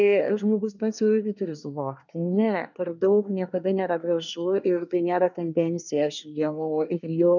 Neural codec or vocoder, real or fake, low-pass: codec, 24 kHz, 1 kbps, SNAC; fake; 7.2 kHz